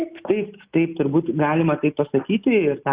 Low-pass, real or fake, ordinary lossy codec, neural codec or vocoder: 3.6 kHz; real; Opus, 64 kbps; none